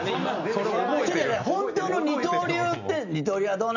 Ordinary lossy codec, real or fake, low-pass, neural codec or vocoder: none; real; 7.2 kHz; none